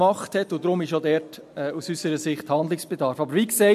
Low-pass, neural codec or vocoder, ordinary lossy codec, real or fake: 14.4 kHz; none; MP3, 64 kbps; real